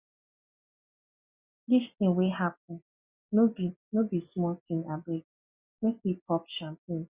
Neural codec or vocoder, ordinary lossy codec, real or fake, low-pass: none; Opus, 64 kbps; real; 3.6 kHz